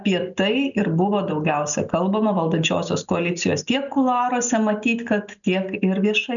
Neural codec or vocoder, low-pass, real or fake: none; 7.2 kHz; real